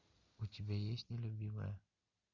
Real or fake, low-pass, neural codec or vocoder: real; 7.2 kHz; none